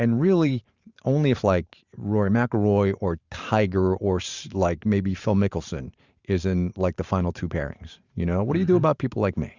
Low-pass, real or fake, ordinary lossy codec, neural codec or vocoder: 7.2 kHz; fake; Opus, 64 kbps; vocoder, 44.1 kHz, 128 mel bands every 512 samples, BigVGAN v2